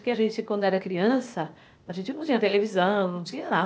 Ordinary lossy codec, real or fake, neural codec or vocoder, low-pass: none; fake; codec, 16 kHz, 0.8 kbps, ZipCodec; none